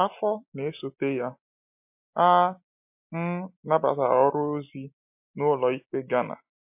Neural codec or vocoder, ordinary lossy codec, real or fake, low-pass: none; MP3, 32 kbps; real; 3.6 kHz